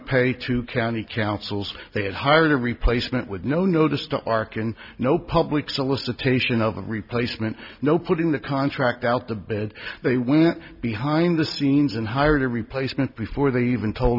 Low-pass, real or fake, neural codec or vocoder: 5.4 kHz; real; none